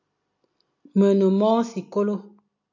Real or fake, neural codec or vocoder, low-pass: real; none; 7.2 kHz